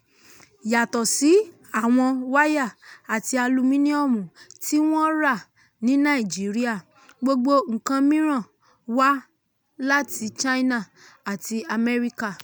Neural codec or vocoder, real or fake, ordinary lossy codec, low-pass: none; real; none; none